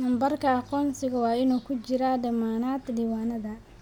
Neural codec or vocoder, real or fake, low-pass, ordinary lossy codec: none; real; 19.8 kHz; none